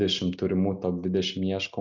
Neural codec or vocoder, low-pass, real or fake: none; 7.2 kHz; real